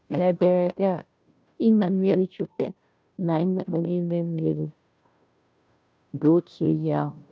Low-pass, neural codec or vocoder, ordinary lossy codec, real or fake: none; codec, 16 kHz, 0.5 kbps, FunCodec, trained on Chinese and English, 25 frames a second; none; fake